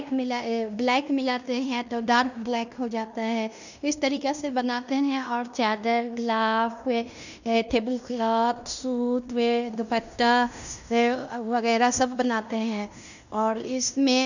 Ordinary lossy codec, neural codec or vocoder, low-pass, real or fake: none; codec, 16 kHz in and 24 kHz out, 0.9 kbps, LongCat-Audio-Codec, fine tuned four codebook decoder; 7.2 kHz; fake